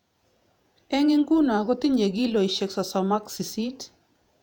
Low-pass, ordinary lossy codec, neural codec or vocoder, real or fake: 19.8 kHz; none; vocoder, 48 kHz, 128 mel bands, Vocos; fake